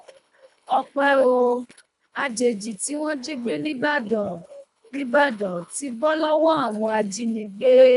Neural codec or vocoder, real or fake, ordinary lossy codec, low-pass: codec, 24 kHz, 1.5 kbps, HILCodec; fake; none; 10.8 kHz